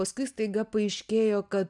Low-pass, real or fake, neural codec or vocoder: 10.8 kHz; real; none